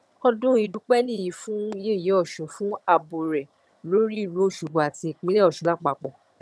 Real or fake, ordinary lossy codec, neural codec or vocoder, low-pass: fake; none; vocoder, 22.05 kHz, 80 mel bands, HiFi-GAN; none